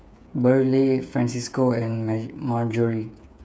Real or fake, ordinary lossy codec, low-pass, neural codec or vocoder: fake; none; none; codec, 16 kHz, 8 kbps, FreqCodec, smaller model